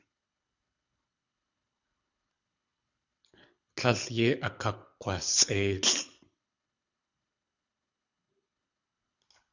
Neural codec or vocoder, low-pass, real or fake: codec, 24 kHz, 6 kbps, HILCodec; 7.2 kHz; fake